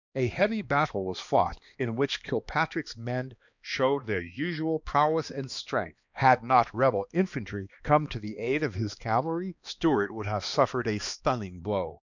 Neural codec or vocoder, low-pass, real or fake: codec, 16 kHz, 2 kbps, X-Codec, HuBERT features, trained on balanced general audio; 7.2 kHz; fake